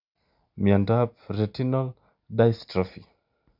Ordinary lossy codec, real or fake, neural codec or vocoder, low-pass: none; real; none; 5.4 kHz